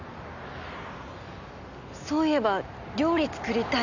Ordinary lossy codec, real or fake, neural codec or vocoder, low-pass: none; real; none; 7.2 kHz